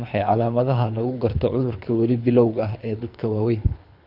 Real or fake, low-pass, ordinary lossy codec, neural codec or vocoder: fake; 5.4 kHz; none; codec, 24 kHz, 3 kbps, HILCodec